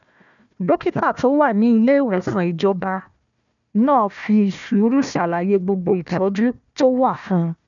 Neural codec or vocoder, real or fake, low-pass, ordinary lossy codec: codec, 16 kHz, 1 kbps, FunCodec, trained on Chinese and English, 50 frames a second; fake; 7.2 kHz; none